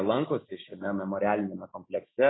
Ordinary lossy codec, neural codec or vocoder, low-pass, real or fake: AAC, 16 kbps; none; 7.2 kHz; real